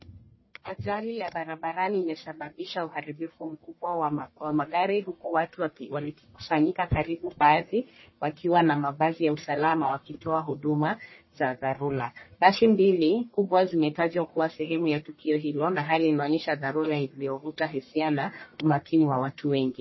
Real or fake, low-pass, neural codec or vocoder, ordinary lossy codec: fake; 7.2 kHz; codec, 44.1 kHz, 1.7 kbps, Pupu-Codec; MP3, 24 kbps